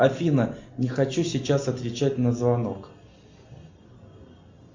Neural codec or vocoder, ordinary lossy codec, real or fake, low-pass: none; MP3, 64 kbps; real; 7.2 kHz